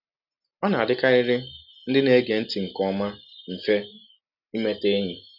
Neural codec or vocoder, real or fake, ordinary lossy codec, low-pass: none; real; none; 5.4 kHz